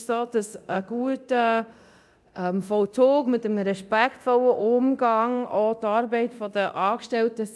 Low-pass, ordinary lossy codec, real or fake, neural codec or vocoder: none; none; fake; codec, 24 kHz, 0.9 kbps, DualCodec